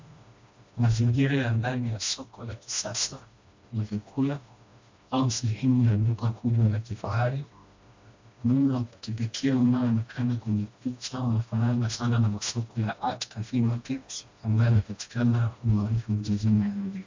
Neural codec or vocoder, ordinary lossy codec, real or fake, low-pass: codec, 16 kHz, 1 kbps, FreqCodec, smaller model; MP3, 64 kbps; fake; 7.2 kHz